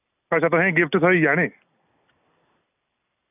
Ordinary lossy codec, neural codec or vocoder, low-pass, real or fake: none; none; 3.6 kHz; real